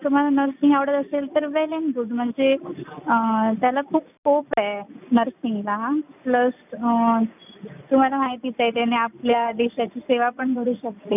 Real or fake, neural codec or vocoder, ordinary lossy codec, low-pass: real; none; none; 3.6 kHz